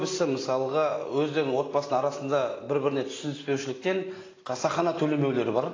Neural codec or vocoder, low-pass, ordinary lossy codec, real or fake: none; 7.2 kHz; AAC, 32 kbps; real